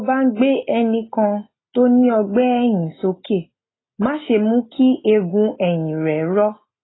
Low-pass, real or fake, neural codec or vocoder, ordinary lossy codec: 7.2 kHz; real; none; AAC, 16 kbps